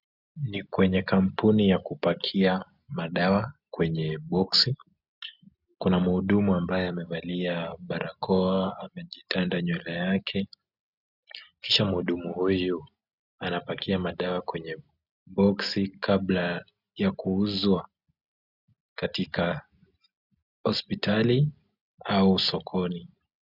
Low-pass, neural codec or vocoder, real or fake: 5.4 kHz; none; real